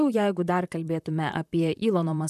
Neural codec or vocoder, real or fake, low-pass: vocoder, 44.1 kHz, 128 mel bands, Pupu-Vocoder; fake; 14.4 kHz